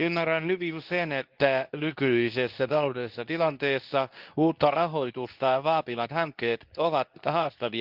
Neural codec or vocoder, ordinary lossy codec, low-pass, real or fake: codec, 24 kHz, 0.9 kbps, WavTokenizer, medium speech release version 2; Opus, 32 kbps; 5.4 kHz; fake